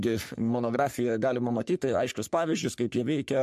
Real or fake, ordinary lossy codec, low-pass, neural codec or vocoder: fake; MP3, 64 kbps; 14.4 kHz; codec, 44.1 kHz, 3.4 kbps, Pupu-Codec